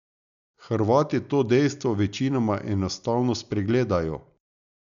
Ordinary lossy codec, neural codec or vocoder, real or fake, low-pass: none; none; real; 7.2 kHz